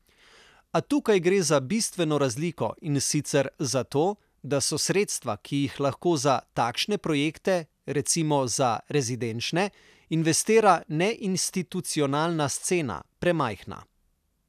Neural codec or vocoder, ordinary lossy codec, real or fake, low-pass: none; none; real; 14.4 kHz